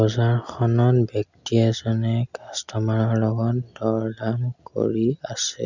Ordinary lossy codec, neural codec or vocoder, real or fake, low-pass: none; none; real; 7.2 kHz